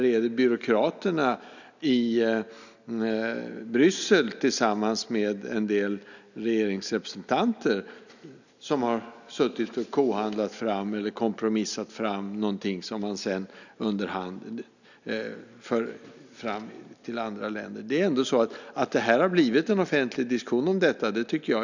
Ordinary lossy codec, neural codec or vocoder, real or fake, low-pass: none; none; real; 7.2 kHz